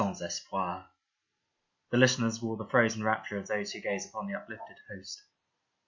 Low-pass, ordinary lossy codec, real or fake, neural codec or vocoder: 7.2 kHz; MP3, 48 kbps; real; none